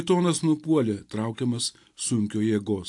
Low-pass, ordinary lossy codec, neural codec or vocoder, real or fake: 10.8 kHz; AAC, 64 kbps; none; real